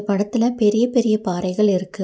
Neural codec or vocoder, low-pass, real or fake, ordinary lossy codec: none; none; real; none